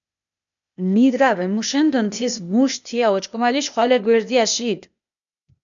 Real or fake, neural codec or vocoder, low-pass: fake; codec, 16 kHz, 0.8 kbps, ZipCodec; 7.2 kHz